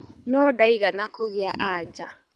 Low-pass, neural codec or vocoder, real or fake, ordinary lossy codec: none; codec, 24 kHz, 3 kbps, HILCodec; fake; none